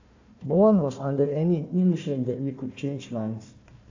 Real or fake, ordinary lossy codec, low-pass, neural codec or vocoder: fake; AAC, 48 kbps; 7.2 kHz; codec, 16 kHz, 1 kbps, FunCodec, trained on Chinese and English, 50 frames a second